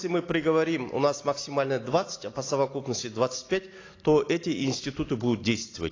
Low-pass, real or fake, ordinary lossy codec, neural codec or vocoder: 7.2 kHz; real; AAC, 32 kbps; none